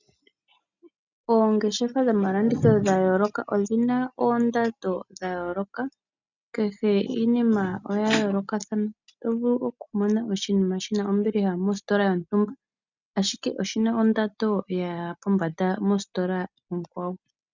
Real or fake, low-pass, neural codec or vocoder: real; 7.2 kHz; none